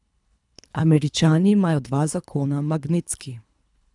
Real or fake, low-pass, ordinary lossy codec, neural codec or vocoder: fake; 10.8 kHz; none; codec, 24 kHz, 3 kbps, HILCodec